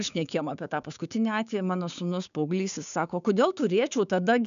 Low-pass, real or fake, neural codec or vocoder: 7.2 kHz; fake; codec, 16 kHz, 6 kbps, DAC